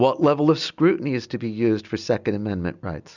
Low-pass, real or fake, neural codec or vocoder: 7.2 kHz; real; none